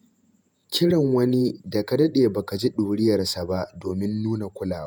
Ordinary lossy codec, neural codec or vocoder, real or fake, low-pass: none; vocoder, 48 kHz, 128 mel bands, Vocos; fake; none